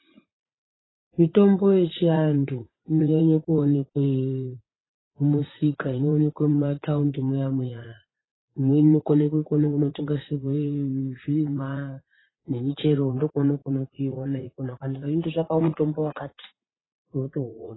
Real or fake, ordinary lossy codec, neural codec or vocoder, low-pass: fake; AAC, 16 kbps; vocoder, 44.1 kHz, 80 mel bands, Vocos; 7.2 kHz